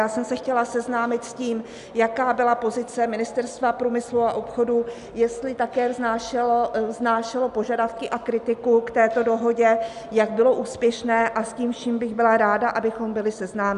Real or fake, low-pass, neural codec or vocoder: real; 10.8 kHz; none